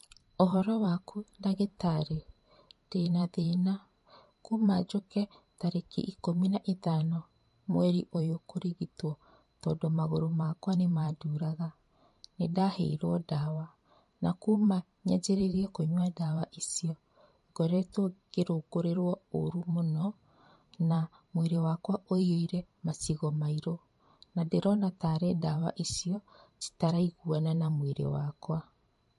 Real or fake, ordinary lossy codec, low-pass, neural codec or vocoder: fake; MP3, 48 kbps; 14.4 kHz; vocoder, 44.1 kHz, 128 mel bands every 512 samples, BigVGAN v2